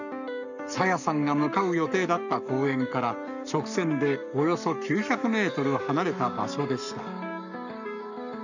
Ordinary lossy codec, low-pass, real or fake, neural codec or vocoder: none; 7.2 kHz; fake; codec, 44.1 kHz, 7.8 kbps, Pupu-Codec